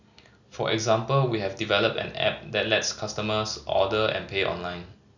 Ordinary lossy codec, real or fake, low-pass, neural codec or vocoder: none; real; 7.2 kHz; none